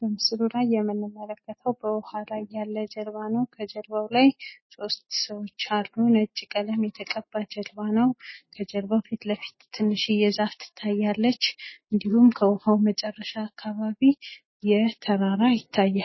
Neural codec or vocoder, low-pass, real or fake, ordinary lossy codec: none; 7.2 kHz; real; MP3, 24 kbps